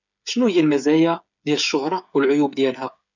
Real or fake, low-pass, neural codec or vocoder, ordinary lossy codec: fake; 7.2 kHz; codec, 16 kHz, 8 kbps, FreqCodec, smaller model; none